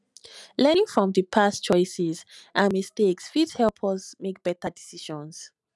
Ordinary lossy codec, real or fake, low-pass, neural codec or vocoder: none; real; none; none